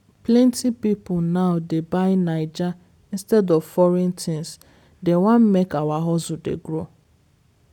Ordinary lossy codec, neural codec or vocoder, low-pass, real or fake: none; none; 19.8 kHz; real